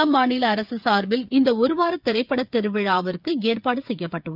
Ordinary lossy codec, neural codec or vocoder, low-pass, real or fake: none; codec, 16 kHz, 8 kbps, FreqCodec, smaller model; 5.4 kHz; fake